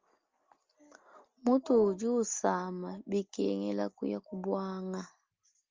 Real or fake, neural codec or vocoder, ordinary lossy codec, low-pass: real; none; Opus, 32 kbps; 7.2 kHz